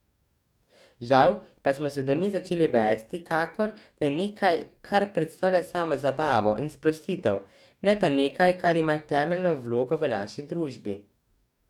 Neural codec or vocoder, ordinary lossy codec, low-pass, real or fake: codec, 44.1 kHz, 2.6 kbps, DAC; none; 19.8 kHz; fake